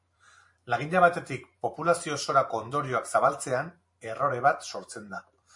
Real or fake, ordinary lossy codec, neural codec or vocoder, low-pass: fake; MP3, 48 kbps; vocoder, 44.1 kHz, 128 mel bands every 512 samples, BigVGAN v2; 10.8 kHz